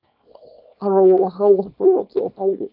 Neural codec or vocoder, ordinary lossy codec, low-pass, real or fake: codec, 24 kHz, 0.9 kbps, WavTokenizer, small release; AAC, 48 kbps; 5.4 kHz; fake